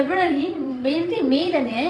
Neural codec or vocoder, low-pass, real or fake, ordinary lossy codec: vocoder, 22.05 kHz, 80 mel bands, Vocos; none; fake; none